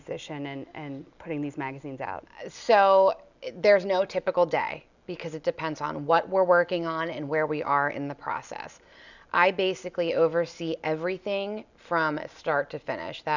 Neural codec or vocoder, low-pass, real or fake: none; 7.2 kHz; real